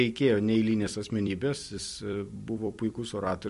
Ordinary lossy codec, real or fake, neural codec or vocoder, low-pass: MP3, 48 kbps; fake; vocoder, 44.1 kHz, 128 mel bands every 256 samples, BigVGAN v2; 14.4 kHz